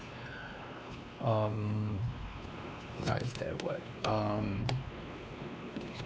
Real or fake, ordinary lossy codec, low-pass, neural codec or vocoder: fake; none; none; codec, 16 kHz, 2 kbps, X-Codec, WavLM features, trained on Multilingual LibriSpeech